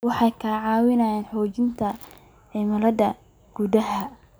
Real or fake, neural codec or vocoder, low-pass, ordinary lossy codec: real; none; none; none